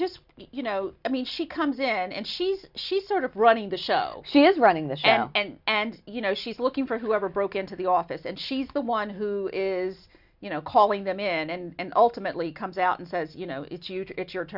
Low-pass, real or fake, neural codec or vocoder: 5.4 kHz; real; none